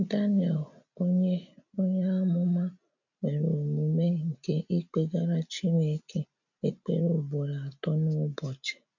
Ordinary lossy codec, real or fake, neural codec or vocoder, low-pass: none; real; none; 7.2 kHz